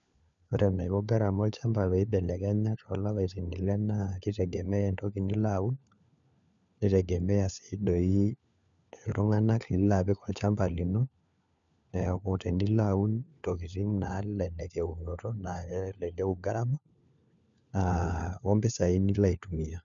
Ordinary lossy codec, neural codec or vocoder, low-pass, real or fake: none; codec, 16 kHz, 4 kbps, FunCodec, trained on LibriTTS, 50 frames a second; 7.2 kHz; fake